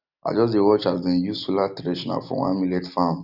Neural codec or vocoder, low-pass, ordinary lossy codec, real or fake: none; 5.4 kHz; none; real